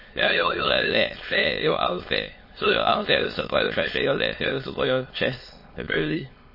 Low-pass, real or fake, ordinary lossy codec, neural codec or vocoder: 5.4 kHz; fake; MP3, 24 kbps; autoencoder, 22.05 kHz, a latent of 192 numbers a frame, VITS, trained on many speakers